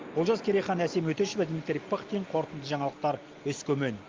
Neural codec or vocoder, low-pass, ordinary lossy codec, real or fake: none; 7.2 kHz; Opus, 32 kbps; real